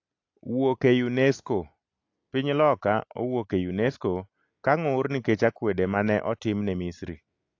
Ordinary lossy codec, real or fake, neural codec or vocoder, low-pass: AAC, 48 kbps; real; none; 7.2 kHz